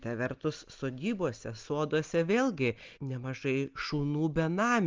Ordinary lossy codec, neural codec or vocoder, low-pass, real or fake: Opus, 32 kbps; none; 7.2 kHz; real